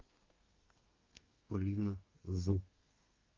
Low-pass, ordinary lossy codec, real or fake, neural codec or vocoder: 7.2 kHz; Opus, 24 kbps; fake; codec, 32 kHz, 1.9 kbps, SNAC